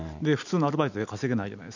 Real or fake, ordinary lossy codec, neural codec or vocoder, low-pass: real; none; none; 7.2 kHz